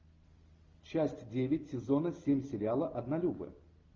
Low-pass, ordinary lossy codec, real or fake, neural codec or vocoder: 7.2 kHz; Opus, 32 kbps; real; none